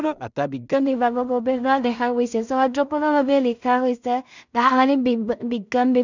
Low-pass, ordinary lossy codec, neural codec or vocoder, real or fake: 7.2 kHz; none; codec, 16 kHz in and 24 kHz out, 0.4 kbps, LongCat-Audio-Codec, two codebook decoder; fake